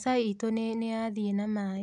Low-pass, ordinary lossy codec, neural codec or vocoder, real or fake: 10.8 kHz; none; none; real